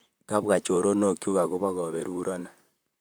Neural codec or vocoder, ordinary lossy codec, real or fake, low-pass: vocoder, 44.1 kHz, 128 mel bands, Pupu-Vocoder; none; fake; none